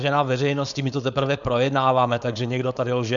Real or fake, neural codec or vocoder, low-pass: fake; codec, 16 kHz, 4.8 kbps, FACodec; 7.2 kHz